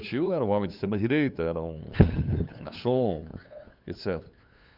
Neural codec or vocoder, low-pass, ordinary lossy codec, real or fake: codec, 16 kHz, 8 kbps, FunCodec, trained on LibriTTS, 25 frames a second; 5.4 kHz; none; fake